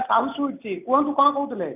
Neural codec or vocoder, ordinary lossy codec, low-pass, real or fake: none; none; 3.6 kHz; real